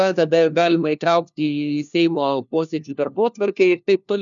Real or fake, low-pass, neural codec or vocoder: fake; 7.2 kHz; codec, 16 kHz, 1 kbps, FunCodec, trained on LibriTTS, 50 frames a second